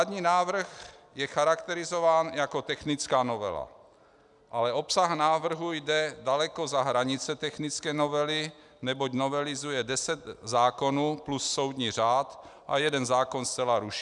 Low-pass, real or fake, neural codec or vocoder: 10.8 kHz; real; none